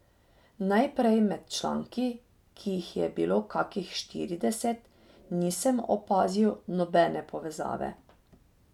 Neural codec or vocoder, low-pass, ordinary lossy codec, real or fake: none; 19.8 kHz; none; real